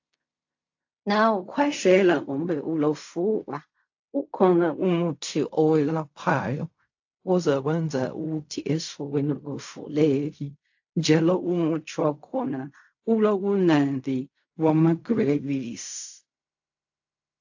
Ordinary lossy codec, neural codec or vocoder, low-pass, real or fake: AAC, 48 kbps; codec, 16 kHz in and 24 kHz out, 0.4 kbps, LongCat-Audio-Codec, fine tuned four codebook decoder; 7.2 kHz; fake